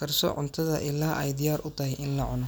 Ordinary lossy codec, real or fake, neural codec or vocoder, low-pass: none; real; none; none